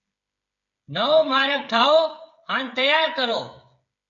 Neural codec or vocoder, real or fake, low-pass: codec, 16 kHz, 8 kbps, FreqCodec, smaller model; fake; 7.2 kHz